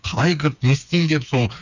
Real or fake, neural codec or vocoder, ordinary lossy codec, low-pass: fake; codec, 16 kHz, 4 kbps, FreqCodec, smaller model; none; 7.2 kHz